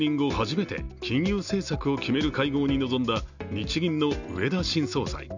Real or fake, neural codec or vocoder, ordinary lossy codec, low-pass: real; none; none; 7.2 kHz